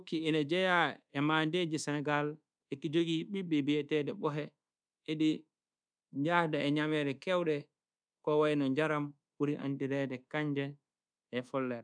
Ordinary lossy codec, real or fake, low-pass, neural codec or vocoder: none; fake; 9.9 kHz; codec, 24 kHz, 1.2 kbps, DualCodec